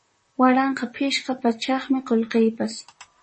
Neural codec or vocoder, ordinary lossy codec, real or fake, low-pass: vocoder, 44.1 kHz, 128 mel bands, Pupu-Vocoder; MP3, 32 kbps; fake; 10.8 kHz